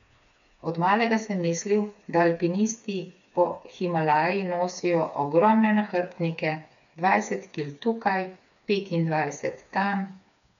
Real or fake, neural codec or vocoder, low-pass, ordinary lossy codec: fake; codec, 16 kHz, 4 kbps, FreqCodec, smaller model; 7.2 kHz; none